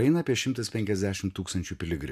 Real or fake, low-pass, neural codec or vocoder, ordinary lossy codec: real; 14.4 kHz; none; AAC, 96 kbps